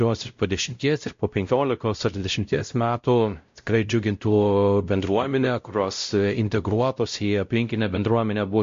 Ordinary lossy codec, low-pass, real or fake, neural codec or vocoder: MP3, 48 kbps; 7.2 kHz; fake; codec, 16 kHz, 0.5 kbps, X-Codec, WavLM features, trained on Multilingual LibriSpeech